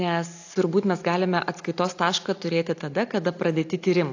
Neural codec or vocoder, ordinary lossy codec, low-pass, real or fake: none; AAC, 48 kbps; 7.2 kHz; real